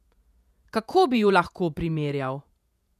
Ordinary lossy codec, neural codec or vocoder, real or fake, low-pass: none; vocoder, 48 kHz, 128 mel bands, Vocos; fake; 14.4 kHz